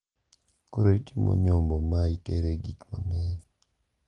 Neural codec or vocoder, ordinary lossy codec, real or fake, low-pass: none; Opus, 24 kbps; real; 10.8 kHz